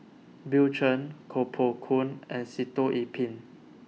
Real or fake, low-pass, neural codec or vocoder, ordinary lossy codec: real; none; none; none